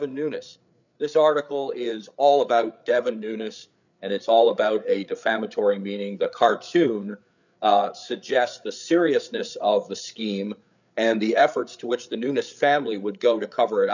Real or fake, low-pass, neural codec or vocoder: fake; 7.2 kHz; codec, 16 kHz, 8 kbps, FreqCodec, larger model